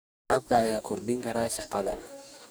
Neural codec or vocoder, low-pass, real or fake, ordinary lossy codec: codec, 44.1 kHz, 2.6 kbps, DAC; none; fake; none